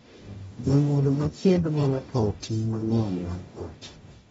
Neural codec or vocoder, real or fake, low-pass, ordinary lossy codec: codec, 44.1 kHz, 0.9 kbps, DAC; fake; 19.8 kHz; AAC, 24 kbps